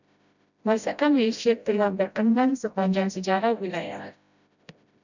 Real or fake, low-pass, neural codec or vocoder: fake; 7.2 kHz; codec, 16 kHz, 0.5 kbps, FreqCodec, smaller model